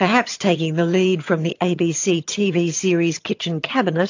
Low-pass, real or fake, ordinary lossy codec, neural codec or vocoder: 7.2 kHz; fake; AAC, 48 kbps; vocoder, 22.05 kHz, 80 mel bands, HiFi-GAN